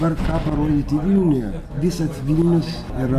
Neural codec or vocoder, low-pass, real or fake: none; 14.4 kHz; real